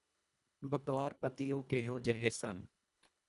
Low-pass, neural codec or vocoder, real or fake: 10.8 kHz; codec, 24 kHz, 1.5 kbps, HILCodec; fake